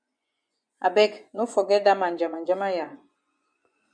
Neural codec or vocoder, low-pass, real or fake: vocoder, 24 kHz, 100 mel bands, Vocos; 9.9 kHz; fake